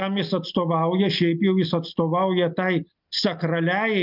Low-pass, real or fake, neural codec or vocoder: 5.4 kHz; real; none